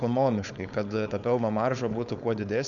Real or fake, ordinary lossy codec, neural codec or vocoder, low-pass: fake; MP3, 96 kbps; codec, 16 kHz, 4.8 kbps, FACodec; 7.2 kHz